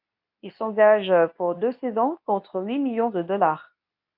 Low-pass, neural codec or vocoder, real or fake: 5.4 kHz; codec, 24 kHz, 0.9 kbps, WavTokenizer, medium speech release version 2; fake